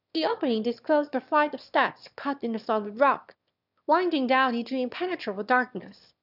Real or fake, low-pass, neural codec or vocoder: fake; 5.4 kHz; autoencoder, 22.05 kHz, a latent of 192 numbers a frame, VITS, trained on one speaker